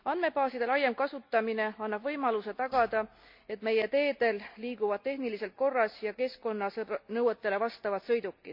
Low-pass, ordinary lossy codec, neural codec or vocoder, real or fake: 5.4 kHz; MP3, 48 kbps; none; real